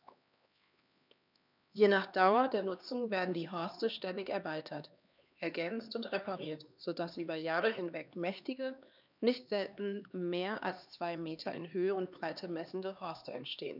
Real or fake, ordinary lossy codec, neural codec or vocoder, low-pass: fake; none; codec, 16 kHz, 2 kbps, X-Codec, HuBERT features, trained on LibriSpeech; 5.4 kHz